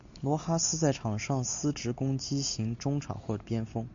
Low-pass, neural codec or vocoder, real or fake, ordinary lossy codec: 7.2 kHz; none; real; AAC, 64 kbps